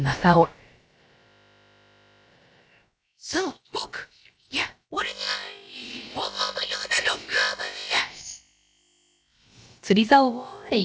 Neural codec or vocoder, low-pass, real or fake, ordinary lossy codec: codec, 16 kHz, about 1 kbps, DyCAST, with the encoder's durations; none; fake; none